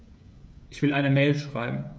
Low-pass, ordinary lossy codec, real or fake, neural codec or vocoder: none; none; fake; codec, 16 kHz, 16 kbps, FreqCodec, smaller model